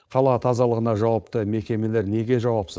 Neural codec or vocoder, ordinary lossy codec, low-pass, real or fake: codec, 16 kHz, 4.8 kbps, FACodec; none; none; fake